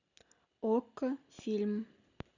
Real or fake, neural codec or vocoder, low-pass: real; none; 7.2 kHz